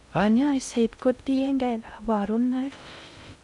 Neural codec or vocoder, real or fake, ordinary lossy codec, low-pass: codec, 16 kHz in and 24 kHz out, 0.6 kbps, FocalCodec, streaming, 2048 codes; fake; none; 10.8 kHz